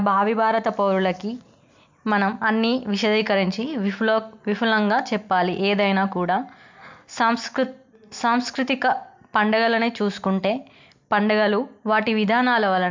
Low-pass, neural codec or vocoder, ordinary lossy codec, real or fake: 7.2 kHz; none; MP3, 64 kbps; real